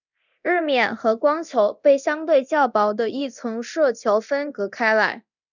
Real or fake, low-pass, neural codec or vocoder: fake; 7.2 kHz; codec, 24 kHz, 0.9 kbps, DualCodec